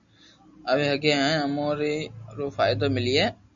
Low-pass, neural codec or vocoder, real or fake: 7.2 kHz; none; real